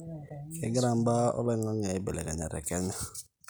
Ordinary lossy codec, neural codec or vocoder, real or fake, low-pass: none; none; real; none